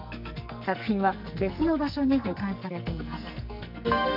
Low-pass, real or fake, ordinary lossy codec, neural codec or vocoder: 5.4 kHz; fake; none; codec, 44.1 kHz, 2.6 kbps, SNAC